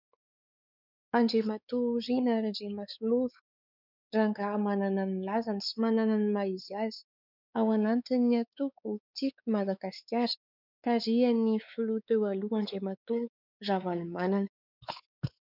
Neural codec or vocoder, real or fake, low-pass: codec, 16 kHz, 4 kbps, X-Codec, WavLM features, trained on Multilingual LibriSpeech; fake; 5.4 kHz